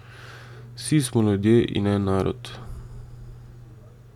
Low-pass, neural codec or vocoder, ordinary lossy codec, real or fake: 19.8 kHz; none; none; real